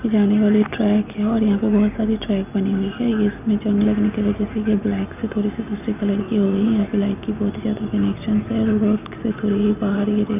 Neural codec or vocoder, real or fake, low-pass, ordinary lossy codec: none; real; 3.6 kHz; none